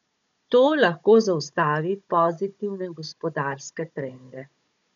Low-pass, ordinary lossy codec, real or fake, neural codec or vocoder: 7.2 kHz; MP3, 64 kbps; fake; codec, 16 kHz, 16 kbps, FunCodec, trained on Chinese and English, 50 frames a second